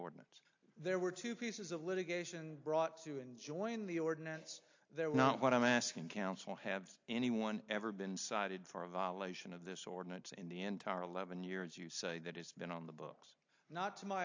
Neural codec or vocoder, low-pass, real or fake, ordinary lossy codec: none; 7.2 kHz; real; AAC, 48 kbps